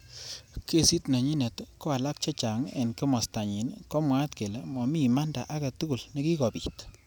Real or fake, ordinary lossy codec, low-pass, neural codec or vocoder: real; none; none; none